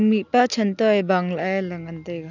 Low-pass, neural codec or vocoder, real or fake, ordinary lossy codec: 7.2 kHz; none; real; none